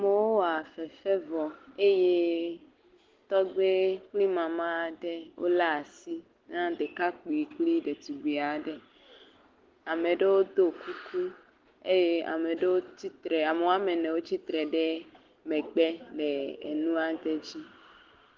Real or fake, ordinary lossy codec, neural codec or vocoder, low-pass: real; Opus, 16 kbps; none; 7.2 kHz